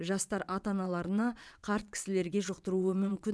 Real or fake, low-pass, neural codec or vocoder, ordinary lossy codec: fake; none; vocoder, 22.05 kHz, 80 mel bands, WaveNeXt; none